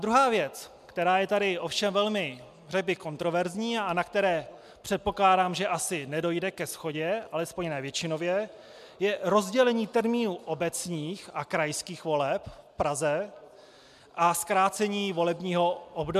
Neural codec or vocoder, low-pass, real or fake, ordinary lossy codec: none; 14.4 kHz; real; MP3, 96 kbps